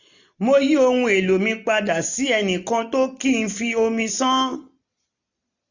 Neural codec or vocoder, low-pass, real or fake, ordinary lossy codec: none; 7.2 kHz; real; none